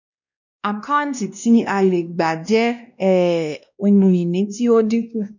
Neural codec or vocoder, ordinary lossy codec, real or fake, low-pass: codec, 16 kHz, 1 kbps, X-Codec, WavLM features, trained on Multilingual LibriSpeech; none; fake; 7.2 kHz